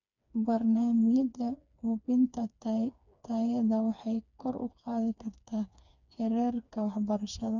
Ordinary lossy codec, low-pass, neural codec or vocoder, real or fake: none; 7.2 kHz; codec, 16 kHz, 4 kbps, FreqCodec, smaller model; fake